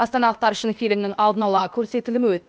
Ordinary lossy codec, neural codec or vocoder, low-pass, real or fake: none; codec, 16 kHz, 0.8 kbps, ZipCodec; none; fake